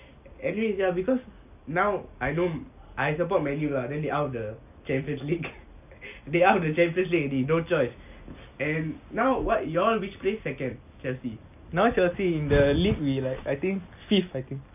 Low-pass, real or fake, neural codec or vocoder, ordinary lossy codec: 3.6 kHz; real; none; none